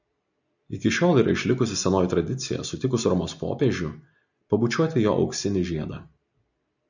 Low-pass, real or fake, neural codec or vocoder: 7.2 kHz; real; none